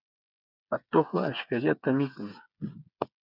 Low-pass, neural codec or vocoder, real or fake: 5.4 kHz; codec, 16 kHz, 8 kbps, FreqCodec, smaller model; fake